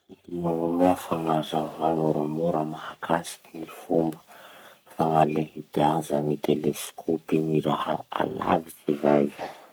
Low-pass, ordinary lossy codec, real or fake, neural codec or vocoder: none; none; fake; codec, 44.1 kHz, 3.4 kbps, Pupu-Codec